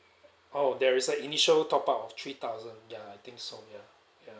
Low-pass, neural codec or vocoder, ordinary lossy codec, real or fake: none; none; none; real